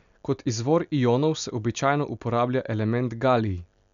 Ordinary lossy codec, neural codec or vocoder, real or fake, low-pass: none; none; real; 7.2 kHz